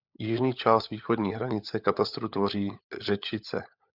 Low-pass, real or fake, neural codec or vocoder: 5.4 kHz; fake; codec, 16 kHz, 16 kbps, FunCodec, trained on LibriTTS, 50 frames a second